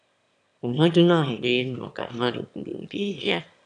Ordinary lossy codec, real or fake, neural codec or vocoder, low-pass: none; fake; autoencoder, 22.05 kHz, a latent of 192 numbers a frame, VITS, trained on one speaker; 9.9 kHz